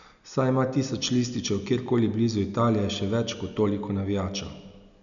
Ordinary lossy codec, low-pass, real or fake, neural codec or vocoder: none; 7.2 kHz; real; none